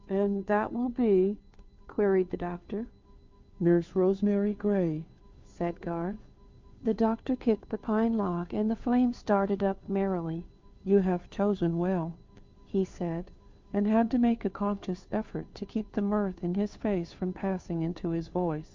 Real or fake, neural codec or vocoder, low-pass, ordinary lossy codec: fake; codec, 16 kHz, 2 kbps, FunCodec, trained on Chinese and English, 25 frames a second; 7.2 kHz; MP3, 64 kbps